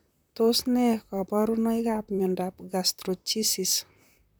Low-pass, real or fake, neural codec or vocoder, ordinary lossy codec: none; fake; vocoder, 44.1 kHz, 128 mel bands, Pupu-Vocoder; none